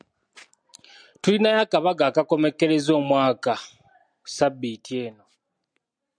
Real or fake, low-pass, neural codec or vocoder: real; 9.9 kHz; none